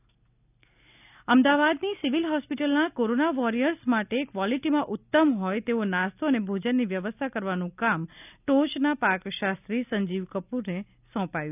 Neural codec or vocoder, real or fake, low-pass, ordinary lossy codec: none; real; 3.6 kHz; none